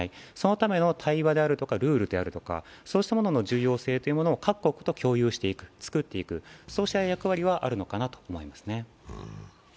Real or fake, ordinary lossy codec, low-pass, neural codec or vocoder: real; none; none; none